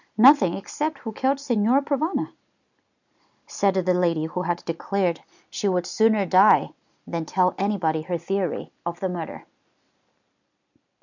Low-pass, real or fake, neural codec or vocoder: 7.2 kHz; real; none